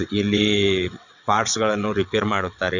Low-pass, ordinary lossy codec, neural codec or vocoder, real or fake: 7.2 kHz; none; vocoder, 22.05 kHz, 80 mel bands, WaveNeXt; fake